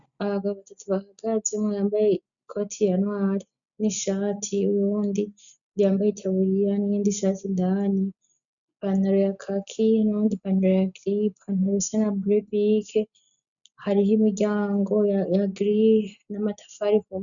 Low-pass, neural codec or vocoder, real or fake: 7.2 kHz; none; real